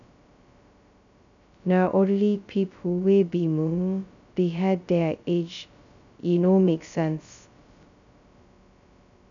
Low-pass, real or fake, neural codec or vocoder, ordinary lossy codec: 7.2 kHz; fake; codec, 16 kHz, 0.2 kbps, FocalCodec; none